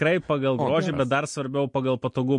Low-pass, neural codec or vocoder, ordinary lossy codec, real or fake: 10.8 kHz; none; MP3, 48 kbps; real